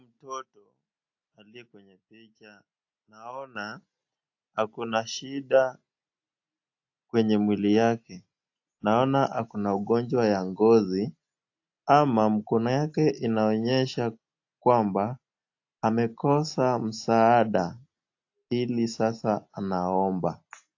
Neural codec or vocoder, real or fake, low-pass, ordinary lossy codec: none; real; 7.2 kHz; AAC, 48 kbps